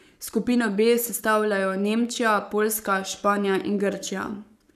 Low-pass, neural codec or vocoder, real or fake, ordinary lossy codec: 14.4 kHz; codec, 44.1 kHz, 7.8 kbps, Pupu-Codec; fake; none